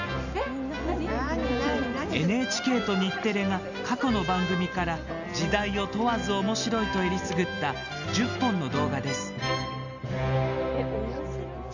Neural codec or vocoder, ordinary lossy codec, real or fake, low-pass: none; none; real; 7.2 kHz